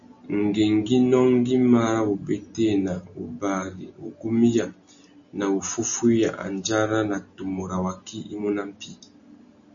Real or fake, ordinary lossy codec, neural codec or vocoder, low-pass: real; MP3, 96 kbps; none; 7.2 kHz